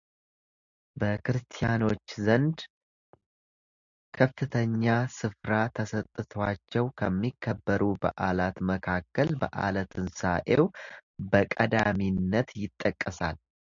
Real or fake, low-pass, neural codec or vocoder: real; 7.2 kHz; none